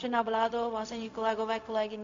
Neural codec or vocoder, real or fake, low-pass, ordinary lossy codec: codec, 16 kHz, 0.4 kbps, LongCat-Audio-Codec; fake; 7.2 kHz; MP3, 48 kbps